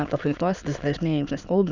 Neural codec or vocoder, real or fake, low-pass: autoencoder, 22.05 kHz, a latent of 192 numbers a frame, VITS, trained on many speakers; fake; 7.2 kHz